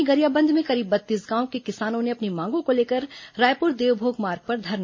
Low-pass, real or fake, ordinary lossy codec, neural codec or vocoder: 7.2 kHz; real; MP3, 32 kbps; none